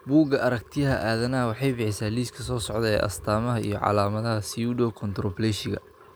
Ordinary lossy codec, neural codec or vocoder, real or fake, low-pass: none; none; real; none